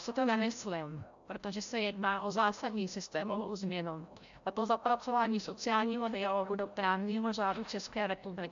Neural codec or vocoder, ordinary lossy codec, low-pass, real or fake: codec, 16 kHz, 0.5 kbps, FreqCodec, larger model; AAC, 64 kbps; 7.2 kHz; fake